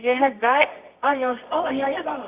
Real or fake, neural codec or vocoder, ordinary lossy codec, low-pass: fake; codec, 24 kHz, 0.9 kbps, WavTokenizer, medium music audio release; Opus, 64 kbps; 3.6 kHz